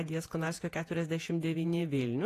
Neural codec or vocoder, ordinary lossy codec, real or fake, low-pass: vocoder, 48 kHz, 128 mel bands, Vocos; AAC, 48 kbps; fake; 14.4 kHz